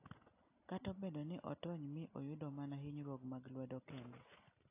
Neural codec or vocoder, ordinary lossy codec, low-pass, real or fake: none; AAC, 24 kbps; 3.6 kHz; real